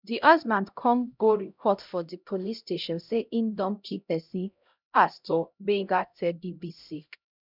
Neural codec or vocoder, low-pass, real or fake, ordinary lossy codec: codec, 16 kHz, 0.5 kbps, X-Codec, HuBERT features, trained on LibriSpeech; 5.4 kHz; fake; none